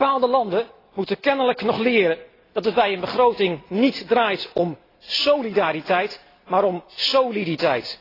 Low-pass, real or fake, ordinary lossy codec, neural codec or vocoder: 5.4 kHz; real; AAC, 24 kbps; none